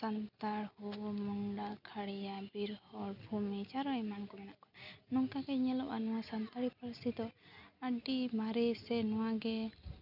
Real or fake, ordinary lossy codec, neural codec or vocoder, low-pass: real; none; none; 5.4 kHz